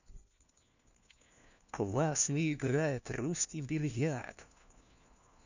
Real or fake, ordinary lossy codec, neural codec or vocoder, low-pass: fake; none; codec, 16 kHz, 1 kbps, FunCodec, trained on LibriTTS, 50 frames a second; 7.2 kHz